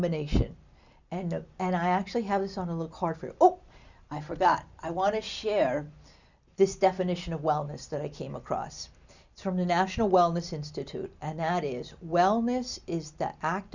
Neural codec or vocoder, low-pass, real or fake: none; 7.2 kHz; real